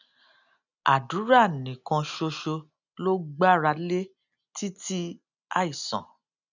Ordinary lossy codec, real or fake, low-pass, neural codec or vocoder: none; real; 7.2 kHz; none